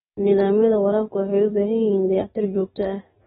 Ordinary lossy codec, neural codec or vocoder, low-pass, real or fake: AAC, 16 kbps; codec, 44.1 kHz, 7.8 kbps, DAC; 19.8 kHz; fake